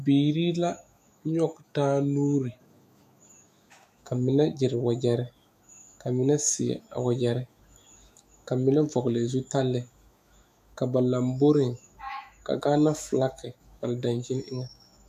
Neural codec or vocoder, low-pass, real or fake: autoencoder, 48 kHz, 128 numbers a frame, DAC-VAE, trained on Japanese speech; 14.4 kHz; fake